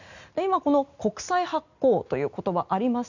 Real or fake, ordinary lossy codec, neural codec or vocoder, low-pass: real; none; none; 7.2 kHz